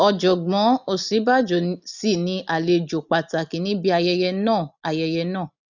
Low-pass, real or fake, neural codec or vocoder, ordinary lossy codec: 7.2 kHz; real; none; none